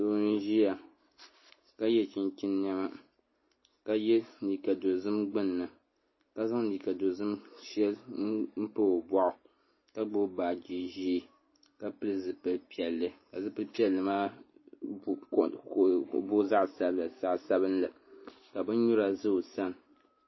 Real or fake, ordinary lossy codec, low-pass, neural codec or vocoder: real; MP3, 24 kbps; 7.2 kHz; none